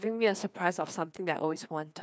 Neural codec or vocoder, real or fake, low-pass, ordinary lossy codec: codec, 16 kHz, 2 kbps, FreqCodec, larger model; fake; none; none